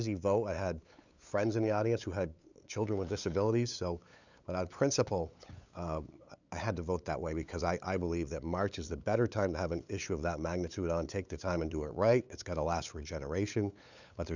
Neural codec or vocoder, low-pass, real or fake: codec, 16 kHz, 8 kbps, FunCodec, trained on LibriTTS, 25 frames a second; 7.2 kHz; fake